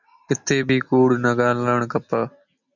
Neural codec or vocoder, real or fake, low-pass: none; real; 7.2 kHz